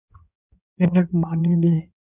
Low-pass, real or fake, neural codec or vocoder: 3.6 kHz; fake; codec, 16 kHz in and 24 kHz out, 2.2 kbps, FireRedTTS-2 codec